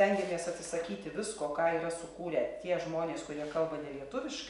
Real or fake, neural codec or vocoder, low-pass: real; none; 10.8 kHz